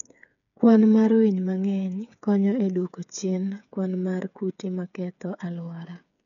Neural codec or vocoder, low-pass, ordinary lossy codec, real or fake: codec, 16 kHz, 8 kbps, FreqCodec, smaller model; 7.2 kHz; none; fake